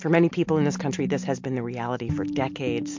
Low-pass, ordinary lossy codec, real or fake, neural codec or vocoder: 7.2 kHz; MP3, 48 kbps; real; none